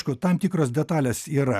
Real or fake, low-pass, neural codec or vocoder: real; 14.4 kHz; none